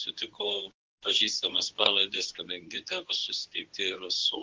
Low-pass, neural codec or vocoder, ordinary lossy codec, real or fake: 7.2 kHz; codec, 24 kHz, 0.9 kbps, WavTokenizer, medium speech release version 2; Opus, 16 kbps; fake